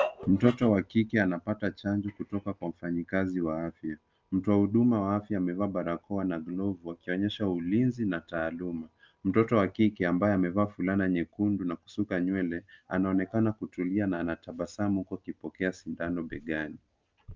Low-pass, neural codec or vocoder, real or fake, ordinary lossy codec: 7.2 kHz; none; real; Opus, 24 kbps